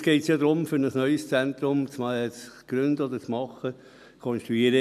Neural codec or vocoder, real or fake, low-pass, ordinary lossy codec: none; real; 14.4 kHz; none